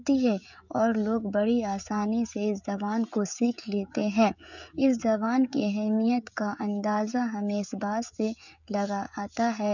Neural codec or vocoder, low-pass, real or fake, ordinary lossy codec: codec, 16 kHz, 16 kbps, FreqCodec, smaller model; 7.2 kHz; fake; none